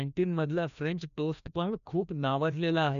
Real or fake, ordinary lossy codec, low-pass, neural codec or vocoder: fake; none; 7.2 kHz; codec, 16 kHz, 1 kbps, FreqCodec, larger model